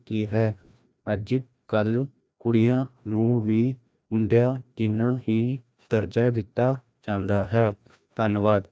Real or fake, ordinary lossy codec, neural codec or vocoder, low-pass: fake; none; codec, 16 kHz, 1 kbps, FreqCodec, larger model; none